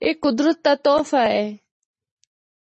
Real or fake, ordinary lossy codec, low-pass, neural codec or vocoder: fake; MP3, 32 kbps; 10.8 kHz; vocoder, 44.1 kHz, 128 mel bands every 512 samples, BigVGAN v2